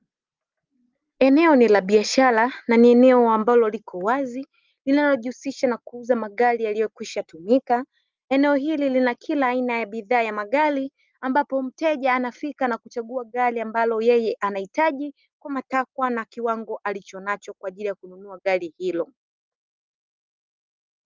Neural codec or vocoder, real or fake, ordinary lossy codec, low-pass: none; real; Opus, 32 kbps; 7.2 kHz